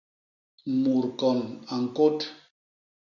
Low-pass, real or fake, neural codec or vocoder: 7.2 kHz; real; none